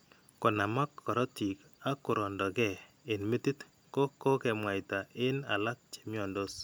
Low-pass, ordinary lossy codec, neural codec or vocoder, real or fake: none; none; none; real